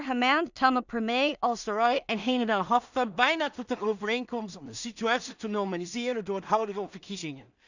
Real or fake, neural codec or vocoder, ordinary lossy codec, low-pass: fake; codec, 16 kHz in and 24 kHz out, 0.4 kbps, LongCat-Audio-Codec, two codebook decoder; none; 7.2 kHz